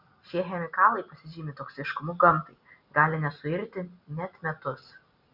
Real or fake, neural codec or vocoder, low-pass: real; none; 5.4 kHz